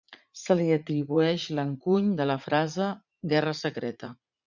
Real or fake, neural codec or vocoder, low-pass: fake; vocoder, 44.1 kHz, 80 mel bands, Vocos; 7.2 kHz